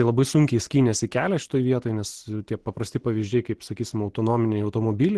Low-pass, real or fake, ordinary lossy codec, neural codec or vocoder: 10.8 kHz; real; Opus, 16 kbps; none